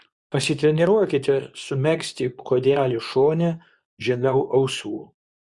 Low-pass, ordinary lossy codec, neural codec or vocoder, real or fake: 10.8 kHz; Opus, 64 kbps; codec, 24 kHz, 0.9 kbps, WavTokenizer, medium speech release version 2; fake